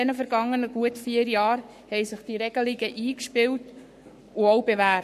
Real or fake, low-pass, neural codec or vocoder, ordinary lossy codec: fake; 14.4 kHz; codec, 44.1 kHz, 7.8 kbps, Pupu-Codec; MP3, 64 kbps